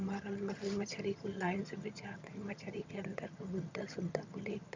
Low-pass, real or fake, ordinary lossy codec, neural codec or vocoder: 7.2 kHz; fake; MP3, 64 kbps; vocoder, 22.05 kHz, 80 mel bands, HiFi-GAN